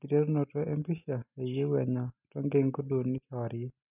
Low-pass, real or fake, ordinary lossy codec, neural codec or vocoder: 3.6 kHz; real; none; none